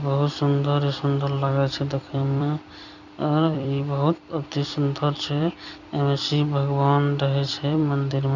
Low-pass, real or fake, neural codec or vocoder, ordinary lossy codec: 7.2 kHz; fake; vocoder, 44.1 kHz, 128 mel bands every 256 samples, BigVGAN v2; none